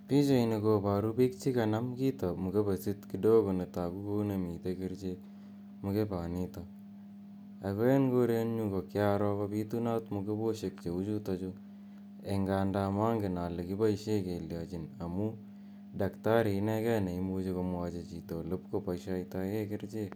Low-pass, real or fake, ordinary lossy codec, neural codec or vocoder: none; real; none; none